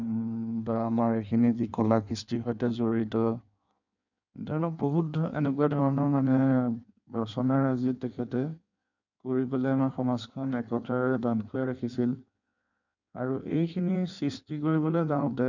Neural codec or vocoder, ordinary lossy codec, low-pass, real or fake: codec, 16 kHz in and 24 kHz out, 1.1 kbps, FireRedTTS-2 codec; none; 7.2 kHz; fake